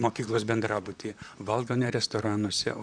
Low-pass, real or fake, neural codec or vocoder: 9.9 kHz; fake; vocoder, 44.1 kHz, 128 mel bands, Pupu-Vocoder